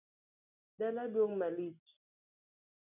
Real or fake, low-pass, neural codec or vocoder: real; 3.6 kHz; none